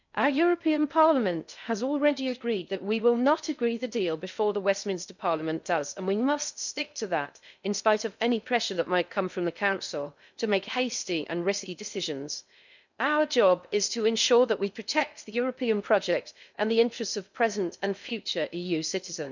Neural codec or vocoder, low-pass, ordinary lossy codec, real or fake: codec, 16 kHz in and 24 kHz out, 0.6 kbps, FocalCodec, streaming, 2048 codes; 7.2 kHz; none; fake